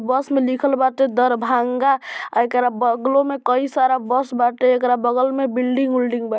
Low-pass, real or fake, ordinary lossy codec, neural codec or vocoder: none; real; none; none